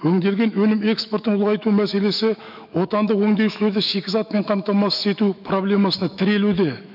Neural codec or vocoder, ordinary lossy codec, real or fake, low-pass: autoencoder, 48 kHz, 128 numbers a frame, DAC-VAE, trained on Japanese speech; none; fake; 5.4 kHz